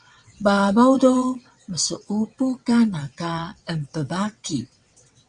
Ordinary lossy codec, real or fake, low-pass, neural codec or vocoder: Opus, 64 kbps; fake; 9.9 kHz; vocoder, 22.05 kHz, 80 mel bands, WaveNeXt